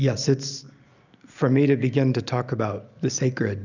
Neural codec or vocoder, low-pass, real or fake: none; 7.2 kHz; real